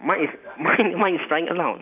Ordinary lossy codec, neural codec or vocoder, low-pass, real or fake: none; vocoder, 44.1 kHz, 128 mel bands every 512 samples, BigVGAN v2; 3.6 kHz; fake